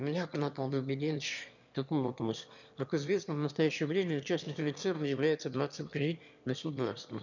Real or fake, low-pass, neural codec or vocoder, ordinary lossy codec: fake; 7.2 kHz; autoencoder, 22.05 kHz, a latent of 192 numbers a frame, VITS, trained on one speaker; none